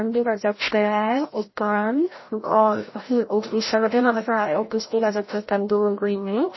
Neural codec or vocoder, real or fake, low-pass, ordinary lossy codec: codec, 16 kHz, 0.5 kbps, FreqCodec, larger model; fake; 7.2 kHz; MP3, 24 kbps